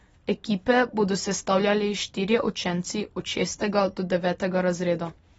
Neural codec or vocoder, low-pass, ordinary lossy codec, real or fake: none; 19.8 kHz; AAC, 24 kbps; real